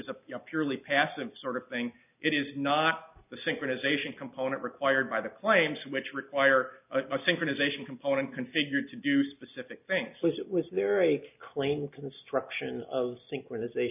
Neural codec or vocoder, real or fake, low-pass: none; real; 3.6 kHz